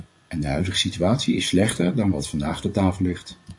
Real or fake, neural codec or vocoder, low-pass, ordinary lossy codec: fake; vocoder, 24 kHz, 100 mel bands, Vocos; 10.8 kHz; AAC, 64 kbps